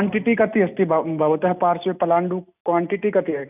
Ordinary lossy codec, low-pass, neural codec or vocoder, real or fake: none; 3.6 kHz; none; real